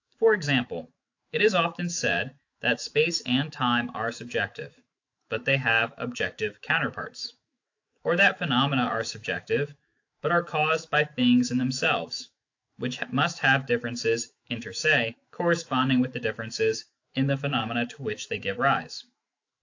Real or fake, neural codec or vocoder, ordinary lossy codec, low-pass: fake; autoencoder, 48 kHz, 128 numbers a frame, DAC-VAE, trained on Japanese speech; AAC, 48 kbps; 7.2 kHz